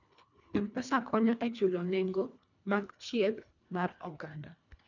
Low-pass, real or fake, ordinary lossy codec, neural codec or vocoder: 7.2 kHz; fake; none; codec, 24 kHz, 1.5 kbps, HILCodec